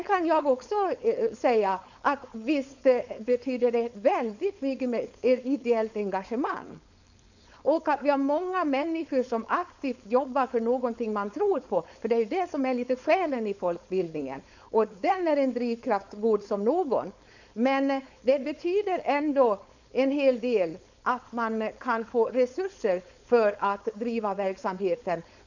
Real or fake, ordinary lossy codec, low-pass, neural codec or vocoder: fake; none; 7.2 kHz; codec, 16 kHz, 4.8 kbps, FACodec